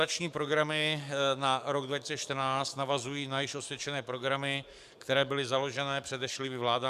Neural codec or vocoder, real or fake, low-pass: codec, 44.1 kHz, 7.8 kbps, DAC; fake; 14.4 kHz